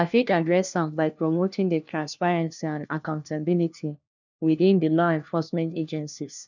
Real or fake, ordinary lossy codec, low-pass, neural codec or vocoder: fake; none; 7.2 kHz; codec, 16 kHz, 1 kbps, FunCodec, trained on LibriTTS, 50 frames a second